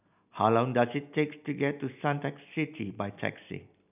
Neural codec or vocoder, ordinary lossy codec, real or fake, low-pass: none; none; real; 3.6 kHz